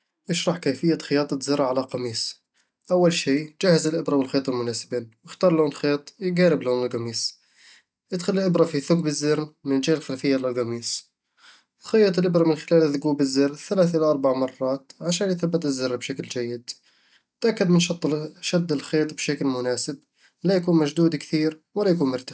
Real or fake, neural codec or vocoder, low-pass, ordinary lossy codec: real; none; none; none